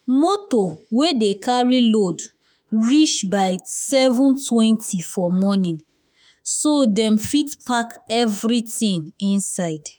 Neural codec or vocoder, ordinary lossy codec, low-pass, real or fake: autoencoder, 48 kHz, 32 numbers a frame, DAC-VAE, trained on Japanese speech; none; none; fake